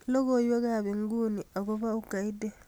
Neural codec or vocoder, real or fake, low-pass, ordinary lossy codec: none; real; none; none